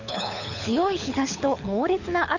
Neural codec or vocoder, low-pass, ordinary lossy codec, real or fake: codec, 24 kHz, 6 kbps, HILCodec; 7.2 kHz; none; fake